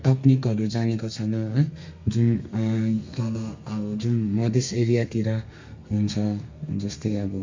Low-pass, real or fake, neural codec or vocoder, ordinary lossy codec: 7.2 kHz; fake; codec, 32 kHz, 1.9 kbps, SNAC; MP3, 48 kbps